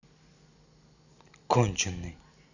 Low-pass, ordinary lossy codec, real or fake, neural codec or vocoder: 7.2 kHz; none; real; none